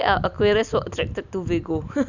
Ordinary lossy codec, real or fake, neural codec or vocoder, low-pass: none; real; none; 7.2 kHz